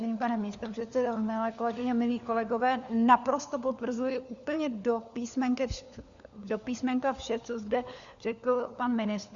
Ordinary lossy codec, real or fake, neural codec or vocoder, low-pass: Opus, 64 kbps; fake; codec, 16 kHz, 4 kbps, FunCodec, trained on LibriTTS, 50 frames a second; 7.2 kHz